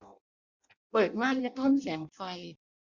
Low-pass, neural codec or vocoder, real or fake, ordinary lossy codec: 7.2 kHz; codec, 16 kHz in and 24 kHz out, 0.6 kbps, FireRedTTS-2 codec; fake; Opus, 64 kbps